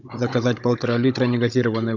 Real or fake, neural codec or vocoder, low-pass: fake; codec, 16 kHz, 16 kbps, FunCodec, trained on Chinese and English, 50 frames a second; 7.2 kHz